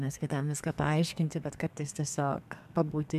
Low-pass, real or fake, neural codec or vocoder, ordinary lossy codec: 14.4 kHz; fake; codec, 32 kHz, 1.9 kbps, SNAC; MP3, 96 kbps